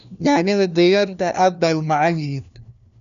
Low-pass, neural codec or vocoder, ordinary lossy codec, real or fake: 7.2 kHz; codec, 16 kHz, 1 kbps, FunCodec, trained on LibriTTS, 50 frames a second; none; fake